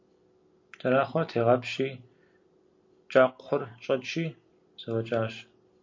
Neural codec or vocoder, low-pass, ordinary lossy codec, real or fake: none; 7.2 kHz; MP3, 48 kbps; real